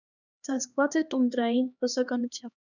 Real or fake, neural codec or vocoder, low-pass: fake; codec, 16 kHz, 2 kbps, X-Codec, HuBERT features, trained on LibriSpeech; 7.2 kHz